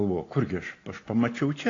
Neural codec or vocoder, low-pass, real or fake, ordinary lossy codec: none; 7.2 kHz; real; AAC, 32 kbps